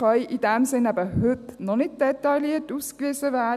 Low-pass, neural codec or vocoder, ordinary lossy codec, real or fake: 14.4 kHz; none; none; real